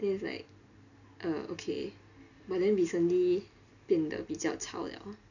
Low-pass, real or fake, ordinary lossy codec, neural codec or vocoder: 7.2 kHz; real; none; none